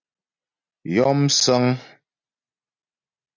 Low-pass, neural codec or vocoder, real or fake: 7.2 kHz; none; real